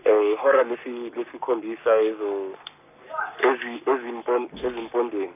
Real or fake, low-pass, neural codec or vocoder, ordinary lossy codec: fake; 3.6 kHz; codec, 44.1 kHz, 7.8 kbps, Pupu-Codec; none